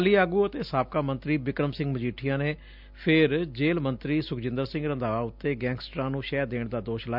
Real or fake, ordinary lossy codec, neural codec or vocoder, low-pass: real; none; none; 5.4 kHz